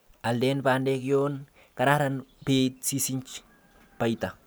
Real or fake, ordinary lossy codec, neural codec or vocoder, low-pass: real; none; none; none